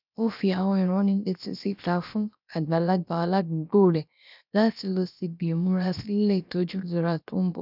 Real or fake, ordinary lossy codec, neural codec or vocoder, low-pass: fake; none; codec, 16 kHz, about 1 kbps, DyCAST, with the encoder's durations; 5.4 kHz